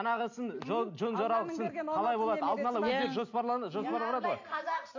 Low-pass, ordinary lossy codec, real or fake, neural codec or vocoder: 7.2 kHz; AAC, 48 kbps; real; none